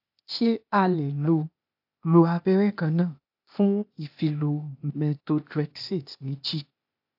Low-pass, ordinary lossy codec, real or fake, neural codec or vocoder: 5.4 kHz; AAC, 48 kbps; fake; codec, 16 kHz, 0.8 kbps, ZipCodec